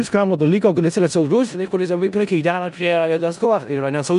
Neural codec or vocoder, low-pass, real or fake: codec, 16 kHz in and 24 kHz out, 0.4 kbps, LongCat-Audio-Codec, four codebook decoder; 10.8 kHz; fake